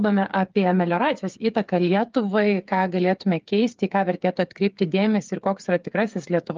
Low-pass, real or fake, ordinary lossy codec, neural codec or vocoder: 7.2 kHz; fake; Opus, 24 kbps; codec, 16 kHz, 8 kbps, FreqCodec, smaller model